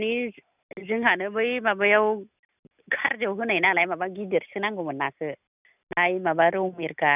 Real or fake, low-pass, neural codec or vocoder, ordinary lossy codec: real; 3.6 kHz; none; none